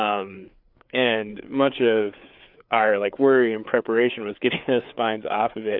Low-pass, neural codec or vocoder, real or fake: 5.4 kHz; codec, 16 kHz, 4 kbps, FreqCodec, larger model; fake